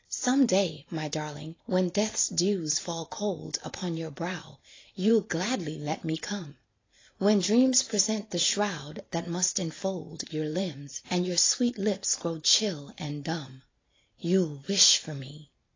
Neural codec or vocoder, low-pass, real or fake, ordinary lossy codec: vocoder, 44.1 kHz, 128 mel bands every 512 samples, BigVGAN v2; 7.2 kHz; fake; AAC, 32 kbps